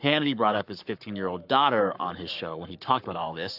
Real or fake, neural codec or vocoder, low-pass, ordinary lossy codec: fake; codec, 44.1 kHz, 7.8 kbps, Pupu-Codec; 5.4 kHz; AAC, 48 kbps